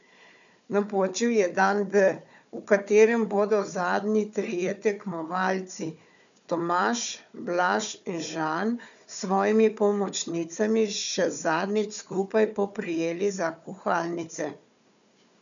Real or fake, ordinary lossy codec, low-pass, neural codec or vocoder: fake; none; 7.2 kHz; codec, 16 kHz, 4 kbps, FunCodec, trained on Chinese and English, 50 frames a second